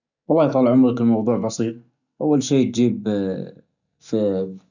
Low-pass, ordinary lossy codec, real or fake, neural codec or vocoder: 7.2 kHz; none; real; none